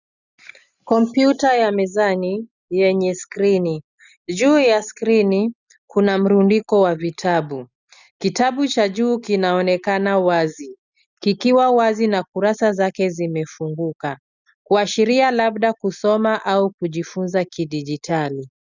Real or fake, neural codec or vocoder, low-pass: real; none; 7.2 kHz